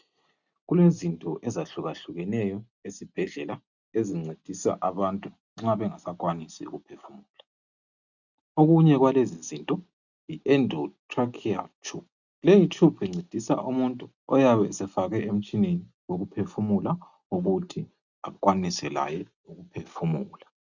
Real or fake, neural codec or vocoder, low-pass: real; none; 7.2 kHz